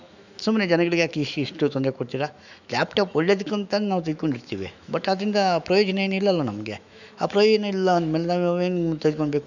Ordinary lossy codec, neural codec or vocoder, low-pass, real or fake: none; autoencoder, 48 kHz, 128 numbers a frame, DAC-VAE, trained on Japanese speech; 7.2 kHz; fake